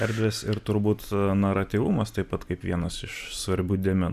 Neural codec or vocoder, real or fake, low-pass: none; real; 14.4 kHz